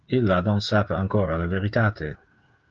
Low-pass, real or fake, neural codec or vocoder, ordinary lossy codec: 7.2 kHz; fake; codec, 16 kHz, 8 kbps, FreqCodec, smaller model; Opus, 24 kbps